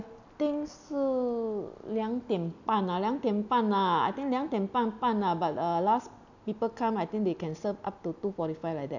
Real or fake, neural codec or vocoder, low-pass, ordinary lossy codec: real; none; 7.2 kHz; none